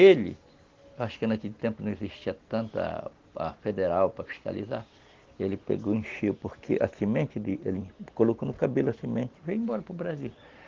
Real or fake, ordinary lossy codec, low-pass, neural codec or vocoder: real; Opus, 16 kbps; 7.2 kHz; none